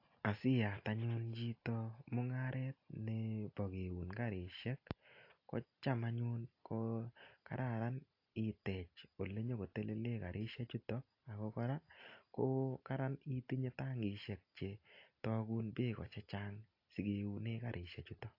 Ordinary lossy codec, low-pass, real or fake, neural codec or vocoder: AAC, 32 kbps; 5.4 kHz; real; none